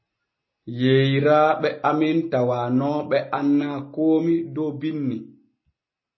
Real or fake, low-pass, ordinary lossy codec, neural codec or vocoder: real; 7.2 kHz; MP3, 24 kbps; none